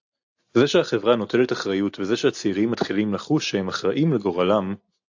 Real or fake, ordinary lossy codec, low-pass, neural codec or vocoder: real; AAC, 48 kbps; 7.2 kHz; none